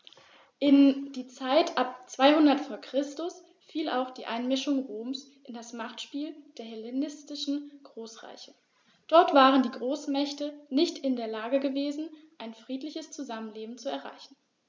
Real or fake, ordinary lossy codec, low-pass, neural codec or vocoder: real; none; none; none